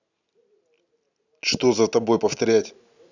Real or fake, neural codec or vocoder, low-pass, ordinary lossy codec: real; none; 7.2 kHz; none